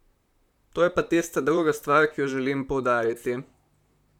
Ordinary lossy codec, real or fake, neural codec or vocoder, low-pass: none; fake; vocoder, 44.1 kHz, 128 mel bands, Pupu-Vocoder; 19.8 kHz